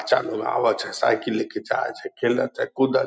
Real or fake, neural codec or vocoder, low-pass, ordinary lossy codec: real; none; none; none